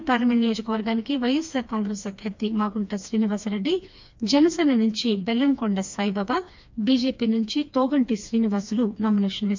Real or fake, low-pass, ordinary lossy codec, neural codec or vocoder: fake; 7.2 kHz; MP3, 64 kbps; codec, 16 kHz, 2 kbps, FreqCodec, smaller model